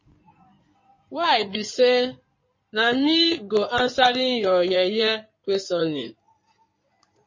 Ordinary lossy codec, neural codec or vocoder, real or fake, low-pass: MP3, 32 kbps; codec, 16 kHz in and 24 kHz out, 2.2 kbps, FireRedTTS-2 codec; fake; 7.2 kHz